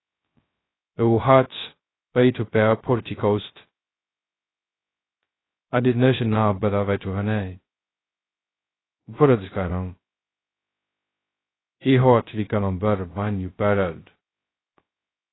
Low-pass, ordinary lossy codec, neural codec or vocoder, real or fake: 7.2 kHz; AAC, 16 kbps; codec, 16 kHz, 0.2 kbps, FocalCodec; fake